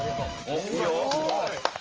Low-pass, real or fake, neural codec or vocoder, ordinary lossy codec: 7.2 kHz; real; none; Opus, 24 kbps